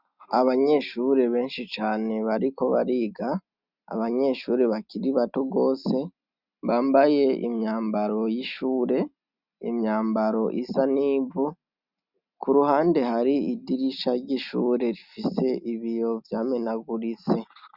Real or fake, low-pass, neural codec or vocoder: real; 5.4 kHz; none